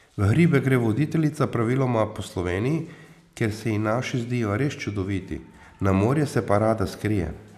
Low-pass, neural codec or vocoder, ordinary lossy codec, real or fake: 14.4 kHz; none; none; real